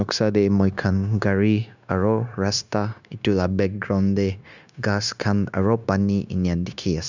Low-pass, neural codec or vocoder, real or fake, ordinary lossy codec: 7.2 kHz; codec, 16 kHz, 0.9 kbps, LongCat-Audio-Codec; fake; none